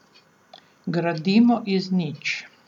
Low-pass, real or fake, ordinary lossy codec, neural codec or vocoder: 19.8 kHz; real; none; none